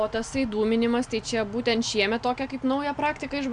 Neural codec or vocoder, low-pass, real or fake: none; 9.9 kHz; real